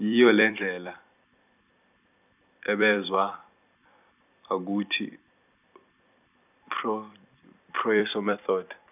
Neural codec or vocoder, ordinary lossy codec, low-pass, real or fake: vocoder, 44.1 kHz, 128 mel bands every 256 samples, BigVGAN v2; none; 3.6 kHz; fake